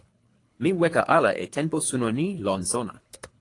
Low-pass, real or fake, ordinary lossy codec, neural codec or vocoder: 10.8 kHz; fake; AAC, 48 kbps; codec, 24 kHz, 3 kbps, HILCodec